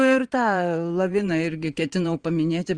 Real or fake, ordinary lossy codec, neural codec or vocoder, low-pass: fake; Opus, 32 kbps; vocoder, 22.05 kHz, 80 mel bands, Vocos; 9.9 kHz